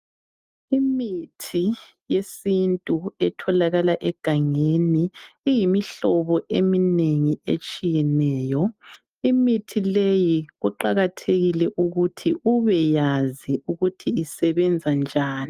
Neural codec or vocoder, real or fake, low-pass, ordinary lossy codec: none; real; 14.4 kHz; Opus, 32 kbps